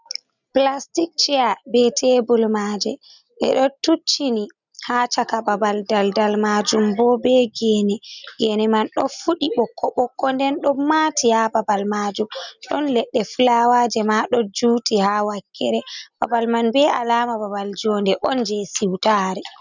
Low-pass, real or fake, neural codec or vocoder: 7.2 kHz; real; none